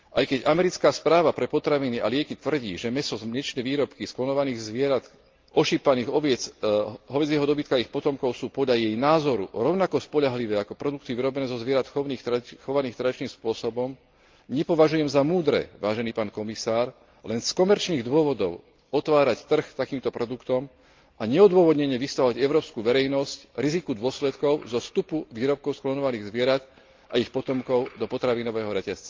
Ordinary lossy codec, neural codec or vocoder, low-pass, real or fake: Opus, 24 kbps; none; 7.2 kHz; real